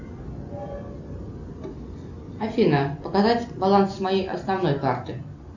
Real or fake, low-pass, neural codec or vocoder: real; 7.2 kHz; none